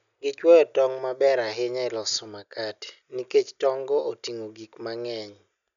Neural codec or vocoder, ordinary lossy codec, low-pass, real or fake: none; none; 7.2 kHz; real